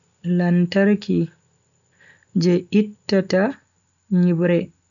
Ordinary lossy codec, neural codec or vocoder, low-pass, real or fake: none; none; 7.2 kHz; real